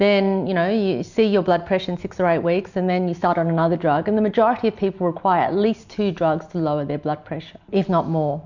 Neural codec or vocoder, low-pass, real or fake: none; 7.2 kHz; real